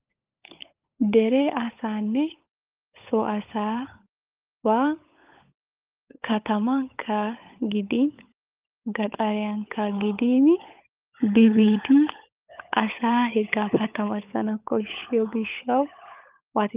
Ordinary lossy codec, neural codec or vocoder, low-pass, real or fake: Opus, 24 kbps; codec, 16 kHz, 16 kbps, FunCodec, trained on LibriTTS, 50 frames a second; 3.6 kHz; fake